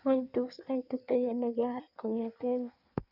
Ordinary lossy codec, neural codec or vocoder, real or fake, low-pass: none; codec, 16 kHz in and 24 kHz out, 1.1 kbps, FireRedTTS-2 codec; fake; 5.4 kHz